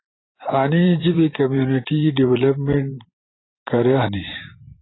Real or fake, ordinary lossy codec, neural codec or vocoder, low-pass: fake; AAC, 16 kbps; vocoder, 44.1 kHz, 128 mel bands every 512 samples, BigVGAN v2; 7.2 kHz